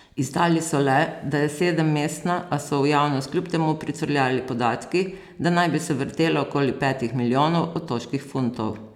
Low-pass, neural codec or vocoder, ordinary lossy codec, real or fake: 19.8 kHz; none; none; real